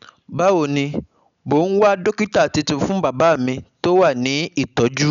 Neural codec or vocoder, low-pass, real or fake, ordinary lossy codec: none; 7.2 kHz; real; none